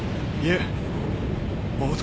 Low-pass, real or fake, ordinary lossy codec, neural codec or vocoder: none; real; none; none